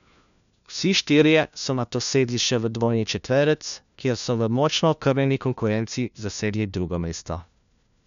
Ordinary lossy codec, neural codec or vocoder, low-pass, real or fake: none; codec, 16 kHz, 1 kbps, FunCodec, trained on LibriTTS, 50 frames a second; 7.2 kHz; fake